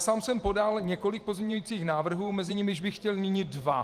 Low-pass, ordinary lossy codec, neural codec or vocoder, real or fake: 14.4 kHz; Opus, 24 kbps; vocoder, 44.1 kHz, 128 mel bands every 512 samples, BigVGAN v2; fake